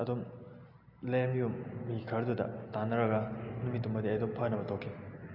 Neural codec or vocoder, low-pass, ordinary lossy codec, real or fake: none; 5.4 kHz; AAC, 48 kbps; real